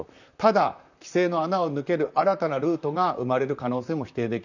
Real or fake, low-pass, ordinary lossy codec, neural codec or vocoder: fake; 7.2 kHz; none; vocoder, 44.1 kHz, 128 mel bands, Pupu-Vocoder